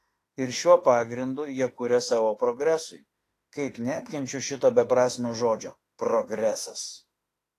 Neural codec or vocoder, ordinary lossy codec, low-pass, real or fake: autoencoder, 48 kHz, 32 numbers a frame, DAC-VAE, trained on Japanese speech; AAC, 48 kbps; 14.4 kHz; fake